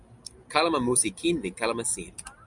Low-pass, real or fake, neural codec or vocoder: 10.8 kHz; real; none